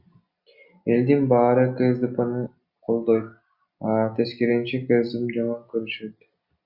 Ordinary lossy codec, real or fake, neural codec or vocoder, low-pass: Opus, 64 kbps; real; none; 5.4 kHz